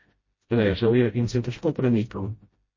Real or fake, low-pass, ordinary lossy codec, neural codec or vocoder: fake; 7.2 kHz; MP3, 32 kbps; codec, 16 kHz, 0.5 kbps, FreqCodec, smaller model